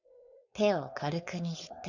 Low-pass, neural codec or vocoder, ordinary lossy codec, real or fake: 7.2 kHz; codec, 16 kHz, 4.8 kbps, FACodec; none; fake